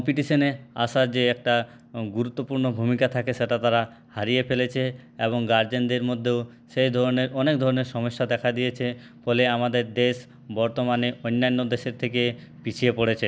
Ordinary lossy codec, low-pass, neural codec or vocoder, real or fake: none; none; none; real